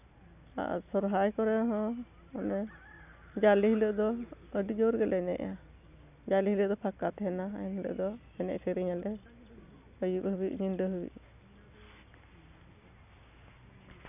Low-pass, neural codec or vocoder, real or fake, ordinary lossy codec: 3.6 kHz; none; real; none